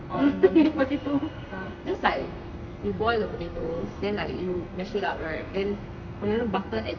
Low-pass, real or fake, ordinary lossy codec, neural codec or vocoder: 7.2 kHz; fake; none; codec, 32 kHz, 1.9 kbps, SNAC